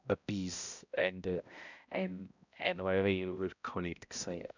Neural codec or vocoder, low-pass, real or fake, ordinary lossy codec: codec, 16 kHz, 0.5 kbps, X-Codec, HuBERT features, trained on balanced general audio; 7.2 kHz; fake; none